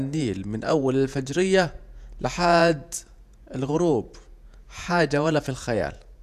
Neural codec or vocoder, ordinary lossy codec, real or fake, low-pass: vocoder, 48 kHz, 128 mel bands, Vocos; none; fake; 14.4 kHz